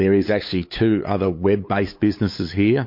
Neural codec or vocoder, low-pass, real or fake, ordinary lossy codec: codec, 16 kHz, 8 kbps, FunCodec, trained on LibriTTS, 25 frames a second; 5.4 kHz; fake; MP3, 32 kbps